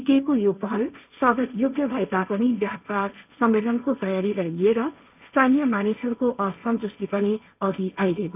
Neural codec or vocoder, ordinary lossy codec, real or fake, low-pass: codec, 16 kHz, 1.1 kbps, Voila-Tokenizer; none; fake; 3.6 kHz